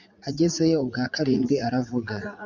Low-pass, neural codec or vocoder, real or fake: 7.2 kHz; vocoder, 44.1 kHz, 80 mel bands, Vocos; fake